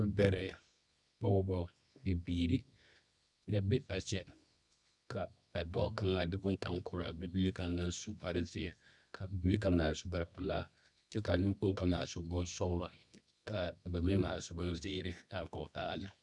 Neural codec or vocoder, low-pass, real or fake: codec, 24 kHz, 0.9 kbps, WavTokenizer, medium music audio release; 10.8 kHz; fake